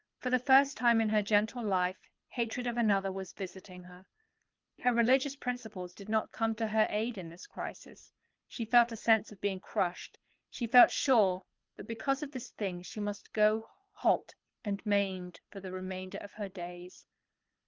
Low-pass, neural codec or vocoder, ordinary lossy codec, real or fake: 7.2 kHz; codec, 24 kHz, 6 kbps, HILCodec; Opus, 32 kbps; fake